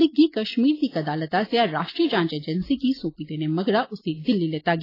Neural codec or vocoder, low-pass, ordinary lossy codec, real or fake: none; 5.4 kHz; AAC, 24 kbps; real